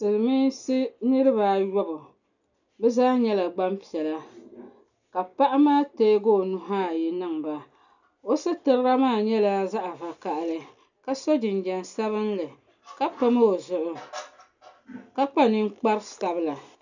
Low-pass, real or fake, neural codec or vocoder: 7.2 kHz; real; none